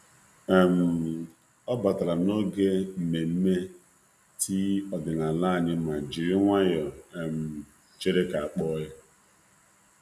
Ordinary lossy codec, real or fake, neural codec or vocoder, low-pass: none; real; none; 14.4 kHz